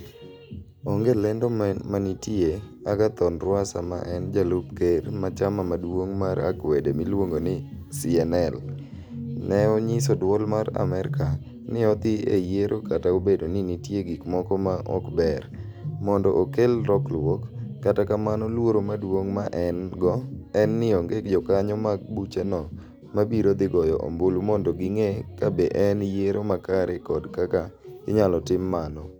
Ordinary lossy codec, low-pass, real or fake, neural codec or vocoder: none; none; real; none